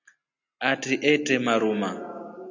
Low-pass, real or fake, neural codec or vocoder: 7.2 kHz; real; none